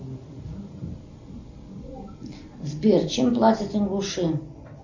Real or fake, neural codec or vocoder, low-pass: real; none; 7.2 kHz